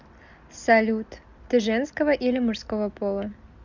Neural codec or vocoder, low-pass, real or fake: none; 7.2 kHz; real